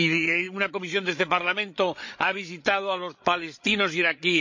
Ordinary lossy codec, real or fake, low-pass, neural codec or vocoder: MP3, 64 kbps; fake; 7.2 kHz; codec, 16 kHz, 8 kbps, FreqCodec, larger model